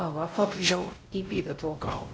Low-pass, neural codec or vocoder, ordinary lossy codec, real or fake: none; codec, 16 kHz, 0.5 kbps, X-Codec, WavLM features, trained on Multilingual LibriSpeech; none; fake